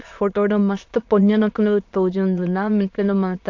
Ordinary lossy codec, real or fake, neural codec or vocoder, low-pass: AAC, 48 kbps; fake; autoencoder, 22.05 kHz, a latent of 192 numbers a frame, VITS, trained on many speakers; 7.2 kHz